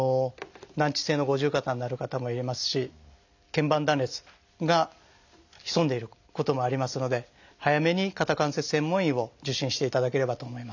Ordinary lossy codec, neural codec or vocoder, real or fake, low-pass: none; none; real; 7.2 kHz